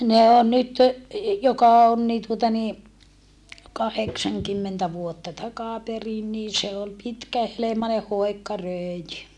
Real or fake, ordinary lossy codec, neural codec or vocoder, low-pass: real; none; none; none